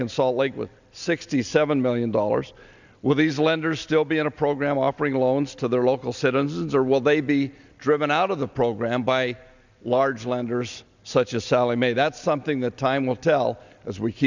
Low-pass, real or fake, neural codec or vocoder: 7.2 kHz; real; none